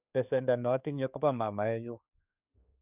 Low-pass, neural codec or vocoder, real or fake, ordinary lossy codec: 3.6 kHz; codec, 16 kHz, 4 kbps, X-Codec, HuBERT features, trained on general audio; fake; none